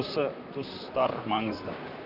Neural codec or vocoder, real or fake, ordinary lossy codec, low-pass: vocoder, 44.1 kHz, 128 mel bands, Pupu-Vocoder; fake; none; 5.4 kHz